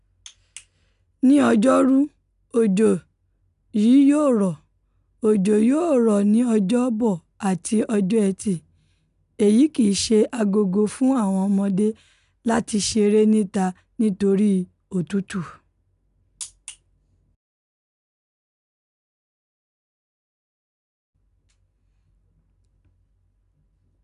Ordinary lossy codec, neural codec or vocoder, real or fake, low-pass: none; none; real; 10.8 kHz